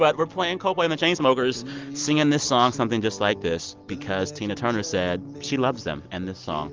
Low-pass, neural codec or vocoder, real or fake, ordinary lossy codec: 7.2 kHz; none; real; Opus, 32 kbps